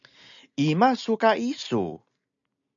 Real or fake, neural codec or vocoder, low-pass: real; none; 7.2 kHz